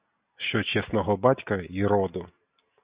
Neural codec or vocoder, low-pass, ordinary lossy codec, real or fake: none; 3.6 kHz; Opus, 64 kbps; real